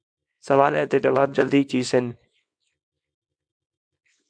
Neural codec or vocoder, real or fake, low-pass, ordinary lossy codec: codec, 24 kHz, 0.9 kbps, WavTokenizer, small release; fake; 9.9 kHz; MP3, 64 kbps